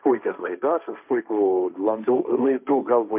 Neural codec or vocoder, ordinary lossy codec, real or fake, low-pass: codec, 16 kHz, 1.1 kbps, Voila-Tokenizer; MP3, 32 kbps; fake; 3.6 kHz